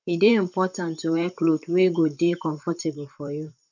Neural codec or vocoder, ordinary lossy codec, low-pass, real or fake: vocoder, 44.1 kHz, 128 mel bands, Pupu-Vocoder; none; 7.2 kHz; fake